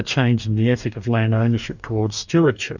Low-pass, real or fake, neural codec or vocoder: 7.2 kHz; fake; codec, 44.1 kHz, 2.6 kbps, DAC